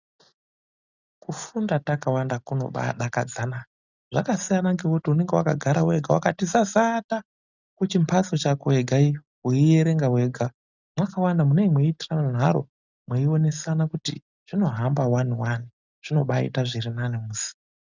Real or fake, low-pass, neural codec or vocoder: real; 7.2 kHz; none